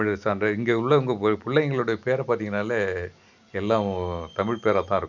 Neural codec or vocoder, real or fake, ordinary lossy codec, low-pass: none; real; none; 7.2 kHz